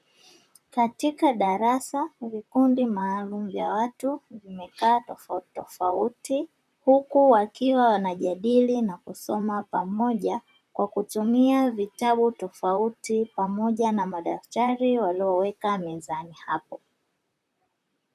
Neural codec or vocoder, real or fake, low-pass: vocoder, 44.1 kHz, 128 mel bands, Pupu-Vocoder; fake; 14.4 kHz